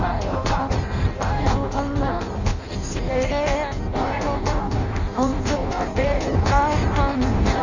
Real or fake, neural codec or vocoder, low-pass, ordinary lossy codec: fake; codec, 16 kHz in and 24 kHz out, 0.6 kbps, FireRedTTS-2 codec; 7.2 kHz; none